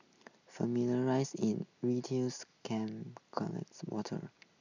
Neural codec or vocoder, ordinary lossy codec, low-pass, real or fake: none; none; 7.2 kHz; real